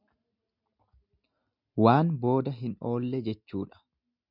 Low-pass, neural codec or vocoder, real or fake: 5.4 kHz; none; real